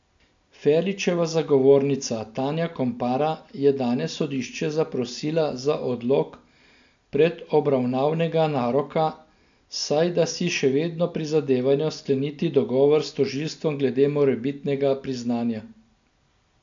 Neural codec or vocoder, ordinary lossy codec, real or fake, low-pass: none; AAC, 64 kbps; real; 7.2 kHz